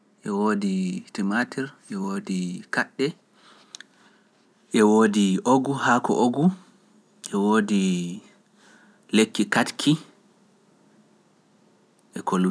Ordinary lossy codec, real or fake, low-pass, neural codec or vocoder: none; real; none; none